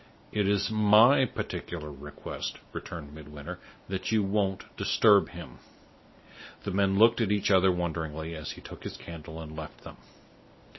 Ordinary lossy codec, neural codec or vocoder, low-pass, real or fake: MP3, 24 kbps; none; 7.2 kHz; real